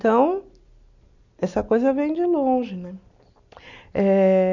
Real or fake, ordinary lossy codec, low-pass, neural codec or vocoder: real; none; 7.2 kHz; none